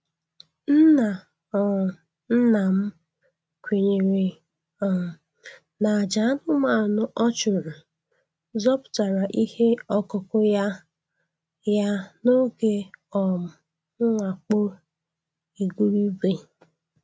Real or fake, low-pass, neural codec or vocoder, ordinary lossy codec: real; none; none; none